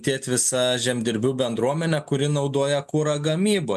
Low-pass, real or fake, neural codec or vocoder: 14.4 kHz; real; none